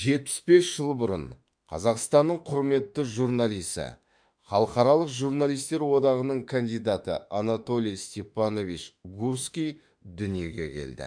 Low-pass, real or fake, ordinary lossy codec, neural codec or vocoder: 9.9 kHz; fake; none; autoencoder, 48 kHz, 32 numbers a frame, DAC-VAE, trained on Japanese speech